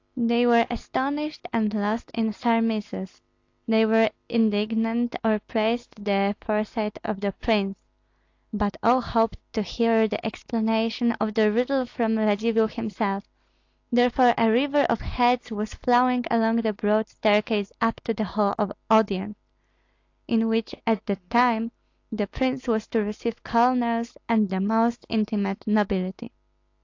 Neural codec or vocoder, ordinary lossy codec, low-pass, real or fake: none; AAC, 48 kbps; 7.2 kHz; real